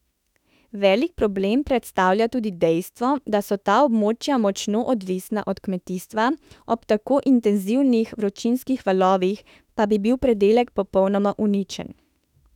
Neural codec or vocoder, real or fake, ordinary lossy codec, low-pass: autoencoder, 48 kHz, 32 numbers a frame, DAC-VAE, trained on Japanese speech; fake; none; 19.8 kHz